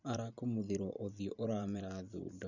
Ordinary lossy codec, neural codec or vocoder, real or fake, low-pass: none; vocoder, 44.1 kHz, 128 mel bands every 512 samples, BigVGAN v2; fake; 7.2 kHz